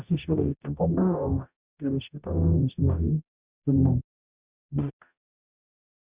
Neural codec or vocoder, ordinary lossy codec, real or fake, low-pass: codec, 44.1 kHz, 0.9 kbps, DAC; Opus, 64 kbps; fake; 3.6 kHz